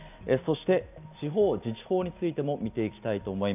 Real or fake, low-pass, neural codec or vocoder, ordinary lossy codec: real; 3.6 kHz; none; none